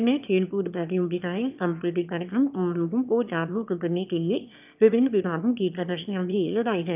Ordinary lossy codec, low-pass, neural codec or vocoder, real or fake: none; 3.6 kHz; autoencoder, 22.05 kHz, a latent of 192 numbers a frame, VITS, trained on one speaker; fake